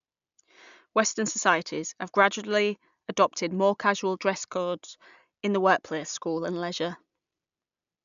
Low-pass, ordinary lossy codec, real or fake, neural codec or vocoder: 7.2 kHz; MP3, 96 kbps; real; none